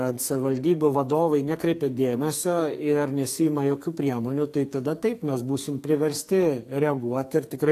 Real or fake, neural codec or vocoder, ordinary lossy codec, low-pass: fake; codec, 44.1 kHz, 2.6 kbps, SNAC; AAC, 64 kbps; 14.4 kHz